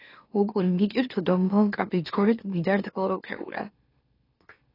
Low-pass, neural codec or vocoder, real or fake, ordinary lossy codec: 5.4 kHz; autoencoder, 44.1 kHz, a latent of 192 numbers a frame, MeloTTS; fake; AAC, 24 kbps